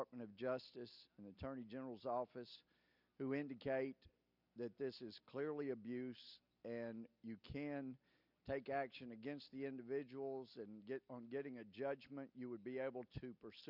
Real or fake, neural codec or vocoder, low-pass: real; none; 5.4 kHz